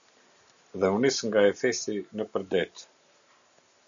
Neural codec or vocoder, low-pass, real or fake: none; 7.2 kHz; real